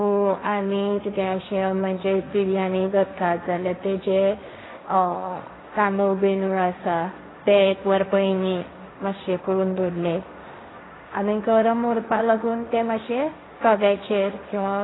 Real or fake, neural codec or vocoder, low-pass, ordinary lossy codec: fake; codec, 16 kHz, 1.1 kbps, Voila-Tokenizer; 7.2 kHz; AAC, 16 kbps